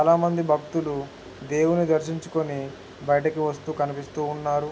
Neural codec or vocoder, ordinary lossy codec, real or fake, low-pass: none; none; real; none